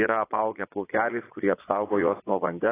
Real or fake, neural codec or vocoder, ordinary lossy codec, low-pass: fake; vocoder, 22.05 kHz, 80 mel bands, Vocos; AAC, 16 kbps; 3.6 kHz